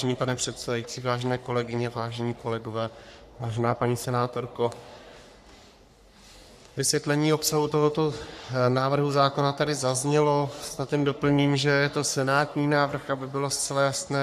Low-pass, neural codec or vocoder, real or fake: 14.4 kHz; codec, 44.1 kHz, 3.4 kbps, Pupu-Codec; fake